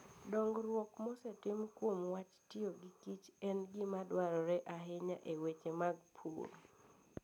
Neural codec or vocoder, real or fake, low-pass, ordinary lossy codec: none; real; 19.8 kHz; none